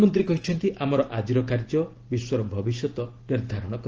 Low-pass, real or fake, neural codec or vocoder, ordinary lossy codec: 7.2 kHz; real; none; Opus, 16 kbps